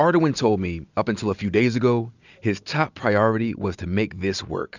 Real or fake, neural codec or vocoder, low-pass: real; none; 7.2 kHz